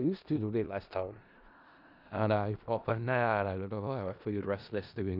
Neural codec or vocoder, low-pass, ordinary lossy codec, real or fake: codec, 16 kHz in and 24 kHz out, 0.4 kbps, LongCat-Audio-Codec, four codebook decoder; 5.4 kHz; none; fake